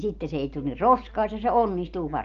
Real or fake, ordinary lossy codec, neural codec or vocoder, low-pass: real; Opus, 32 kbps; none; 7.2 kHz